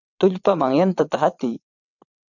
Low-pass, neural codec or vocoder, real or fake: 7.2 kHz; vocoder, 22.05 kHz, 80 mel bands, WaveNeXt; fake